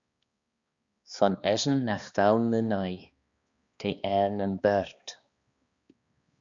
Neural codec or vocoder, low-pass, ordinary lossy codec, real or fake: codec, 16 kHz, 2 kbps, X-Codec, HuBERT features, trained on balanced general audio; 7.2 kHz; Opus, 64 kbps; fake